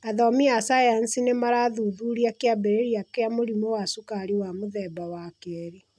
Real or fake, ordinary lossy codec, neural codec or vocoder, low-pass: real; none; none; none